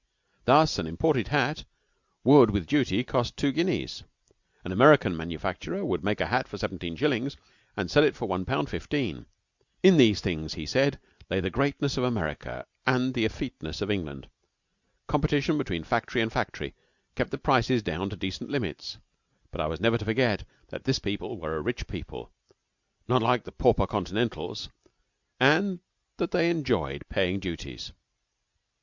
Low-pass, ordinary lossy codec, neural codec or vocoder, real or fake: 7.2 kHz; Opus, 64 kbps; none; real